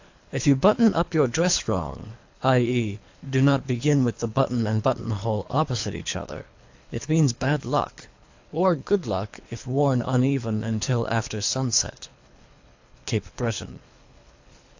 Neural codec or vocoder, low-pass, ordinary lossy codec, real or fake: codec, 24 kHz, 3 kbps, HILCodec; 7.2 kHz; AAC, 48 kbps; fake